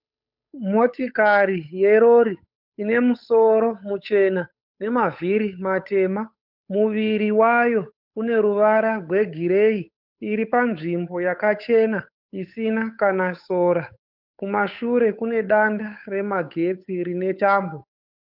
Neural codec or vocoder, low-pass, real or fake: codec, 16 kHz, 8 kbps, FunCodec, trained on Chinese and English, 25 frames a second; 5.4 kHz; fake